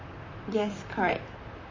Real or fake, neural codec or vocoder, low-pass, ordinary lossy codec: fake; vocoder, 44.1 kHz, 128 mel bands, Pupu-Vocoder; 7.2 kHz; MP3, 32 kbps